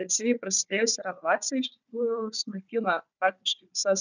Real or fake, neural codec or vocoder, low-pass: fake; codec, 16 kHz, 4 kbps, FunCodec, trained on Chinese and English, 50 frames a second; 7.2 kHz